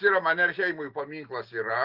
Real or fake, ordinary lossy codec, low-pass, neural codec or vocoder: real; Opus, 32 kbps; 5.4 kHz; none